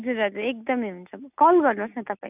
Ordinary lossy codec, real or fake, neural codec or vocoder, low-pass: none; real; none; 3.6 kHz